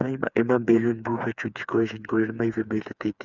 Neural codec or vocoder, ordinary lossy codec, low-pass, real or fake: codec, 16 kHz, 4 kbps, FreqCodec, smaller model; none; 7.2 kHz; fake